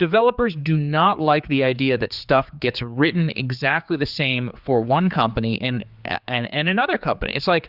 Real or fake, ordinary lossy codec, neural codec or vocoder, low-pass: fake; Opus, 64 kbps; codec, 16 kHz, 4 kbps, X-Codec, HuBERT features, trained on general audio; 5.4 kHz